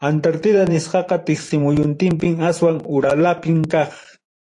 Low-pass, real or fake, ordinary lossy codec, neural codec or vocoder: 10.8 kHz; fake; AAC, 48 kbps; vocoder, 44.1 kHz, 128 mel bands every 512 samples, BigVGAN v2